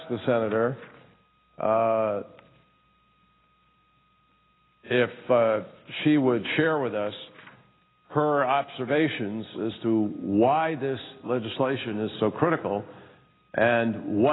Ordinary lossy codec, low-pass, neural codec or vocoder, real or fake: AAC, 16 kbps; 7.2 kHz; none; real